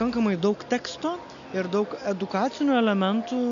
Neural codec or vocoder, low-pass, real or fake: none; 7.2 kHz; real